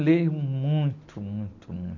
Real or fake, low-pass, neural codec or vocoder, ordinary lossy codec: real; 7.2 kHz; none; none